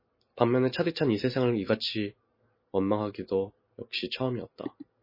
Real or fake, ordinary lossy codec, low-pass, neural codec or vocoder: real; MP3, 24 kbps; 5.4 kHz; none